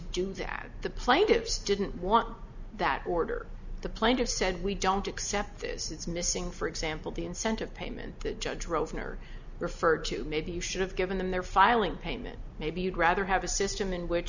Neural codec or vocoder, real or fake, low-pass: none; real; 7.2 kHz